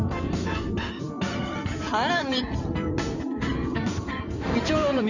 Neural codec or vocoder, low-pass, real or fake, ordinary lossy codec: codec, 16 kHz in and 24 kHz out, 2.2 kbps, FireRedTTS-2 codec; 7.2 kHz; fake; none